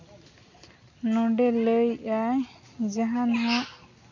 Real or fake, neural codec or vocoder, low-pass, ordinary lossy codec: real; none; 7.2 kHz; none